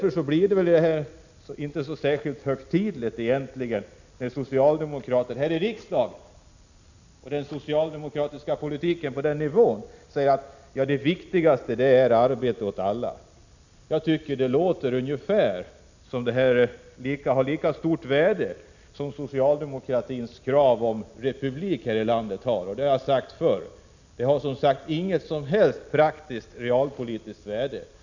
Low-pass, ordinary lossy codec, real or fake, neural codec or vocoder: 7.2 kHz; none; real; none